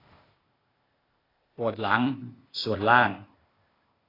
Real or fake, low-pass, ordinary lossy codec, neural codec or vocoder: fake; 5.4 kHz; AAC, 24 kbps; codec, 16 kHz, 0.8 kbps, ZipCodec